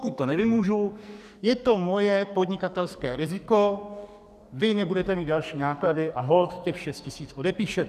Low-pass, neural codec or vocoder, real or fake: 14.4 kHz; codec, 44.1 kHz, 2.6 kbps, SNAC; fake